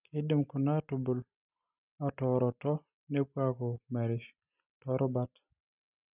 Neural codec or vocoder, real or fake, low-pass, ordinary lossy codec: none; real; 3.6 kHz; none